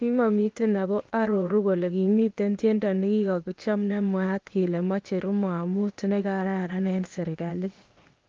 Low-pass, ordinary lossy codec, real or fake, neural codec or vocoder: 7.2 kHz; Opus, 32 kbps; fake; codec, 16 kHz, 0.8 kbps, ZipCodec